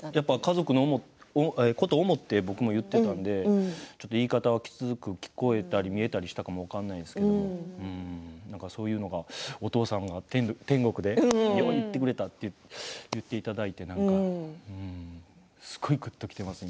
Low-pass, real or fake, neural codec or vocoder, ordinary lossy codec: none; real; none; none